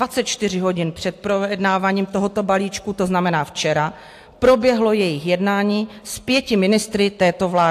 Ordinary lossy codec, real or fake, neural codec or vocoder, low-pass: AAC, 64 kbps; real; none; 14.4 kHz